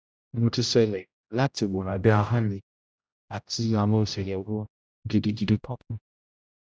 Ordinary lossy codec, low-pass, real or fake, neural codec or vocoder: none; none; fake; codec, 16 kHz, 0.5 kbps, X-Codec, HuBERT features, trained on general audio